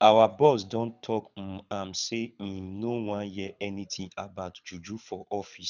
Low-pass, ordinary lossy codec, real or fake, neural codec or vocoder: 7.2 kHz; none; fake; codec, 24 kHz, 6 kbps, HILCodec